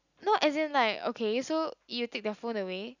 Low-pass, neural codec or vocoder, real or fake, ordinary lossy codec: 7.2 kHz; none; real; none